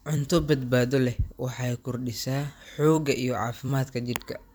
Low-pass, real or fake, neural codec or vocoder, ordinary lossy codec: none; fake; vocoder, 44.1 kHz, 128 mel bands every 256 samples, BigVGAN v2; none